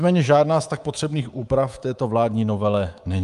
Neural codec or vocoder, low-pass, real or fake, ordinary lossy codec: codec, 24 kHz, 3.1 kbps, DualCodec; 10.8 kHz; fake; Opus, 32 kbps